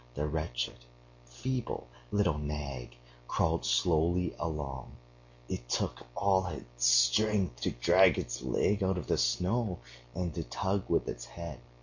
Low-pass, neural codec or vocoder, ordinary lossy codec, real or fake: 7.2 kHz; none; MP3, 48 kbps; real